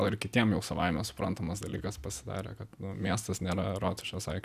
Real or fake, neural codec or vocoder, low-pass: real; none; 14.4 kHz